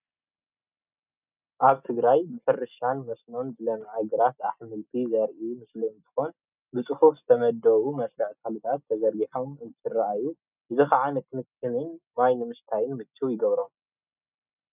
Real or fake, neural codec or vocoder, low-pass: real; none; 3.6 kHz